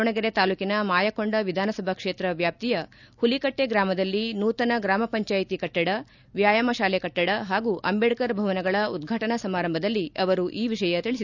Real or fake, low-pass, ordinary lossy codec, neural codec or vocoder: real; 7.2 kHz; none; none